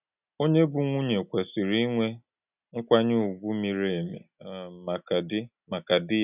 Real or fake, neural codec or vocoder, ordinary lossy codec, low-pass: real; none; none; 3.6 kHz